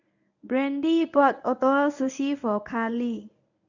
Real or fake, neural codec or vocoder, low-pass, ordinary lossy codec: fake; codec, 24 kHz, 0.9 kbps, WavTokenizer, medium speech release version 1; 7.2 kHz; none